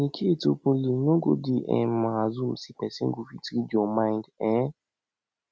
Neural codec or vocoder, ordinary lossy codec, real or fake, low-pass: none; none; real; none